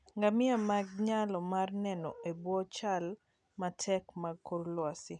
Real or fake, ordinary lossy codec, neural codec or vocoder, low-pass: real; none; none; 9.9 kHz